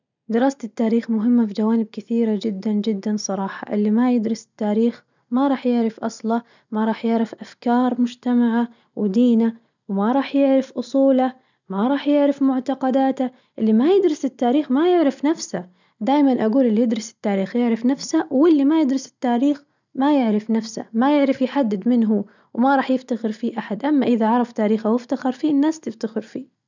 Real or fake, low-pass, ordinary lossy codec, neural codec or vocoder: real; 7.2 kHz; none; none